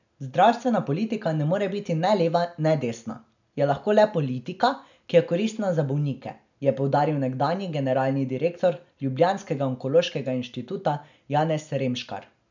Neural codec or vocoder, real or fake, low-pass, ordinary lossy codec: none; real; 7.2 kHz; none